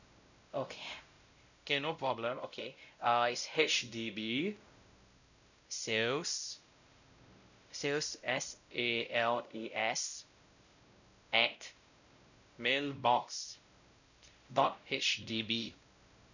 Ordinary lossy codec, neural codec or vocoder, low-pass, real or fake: none; codec, 16 kHz, 0.5 kbps, X-Codec, WavLM features, trained on Multilingual LibriSpeech; 7.2 kHz; fake